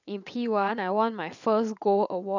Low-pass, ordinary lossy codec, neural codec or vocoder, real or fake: 7.2 kHz; none; vocoder, 22.05 kHz, 80 mel bands, WaveNeXt; fake